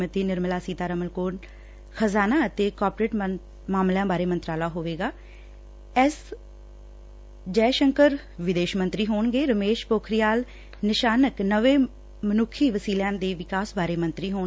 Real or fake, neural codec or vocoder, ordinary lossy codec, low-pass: real; none; none; none